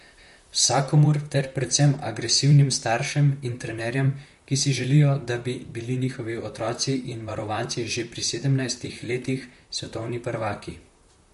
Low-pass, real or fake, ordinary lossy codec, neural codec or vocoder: 14.4 kHz; fake; MP3, 48 kbps; vocoder, 44.1 kHz, 128 mel bands, Pupu-Vocoder